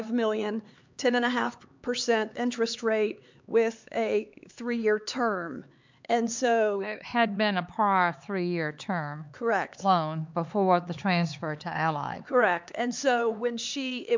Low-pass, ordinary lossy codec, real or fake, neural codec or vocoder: 7.2 kHz; MP3, 64 kbps; fake; codec, 16 kHz, 4 kbps, X-Codec, HuBERT features, trained on LibriSpeech